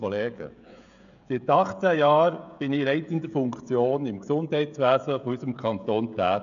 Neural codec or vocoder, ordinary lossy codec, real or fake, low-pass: codec, 16 kHz, 16 kbps, FreqCodec, smaller model; none; fake; 7.2 kHz